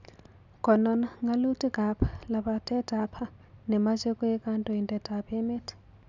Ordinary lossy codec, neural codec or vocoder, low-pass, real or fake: none; none; 7.2 kHz; real